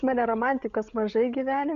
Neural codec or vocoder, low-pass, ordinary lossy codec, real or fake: codec, 16 kHz, 16 kbps, FreqCodec, larger model; 7.2 kHz; Opus, 64 kbps; fake